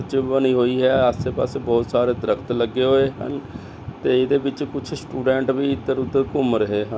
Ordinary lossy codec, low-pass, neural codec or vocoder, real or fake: none; none; none; real